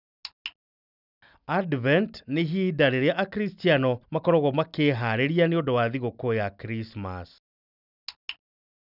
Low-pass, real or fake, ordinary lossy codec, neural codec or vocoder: 5.4 kHz; real; none; none